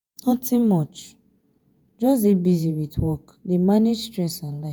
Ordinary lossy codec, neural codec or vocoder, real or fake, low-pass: none; vocoder, 48 kHz, 128 mel bands, Vocos; fake; none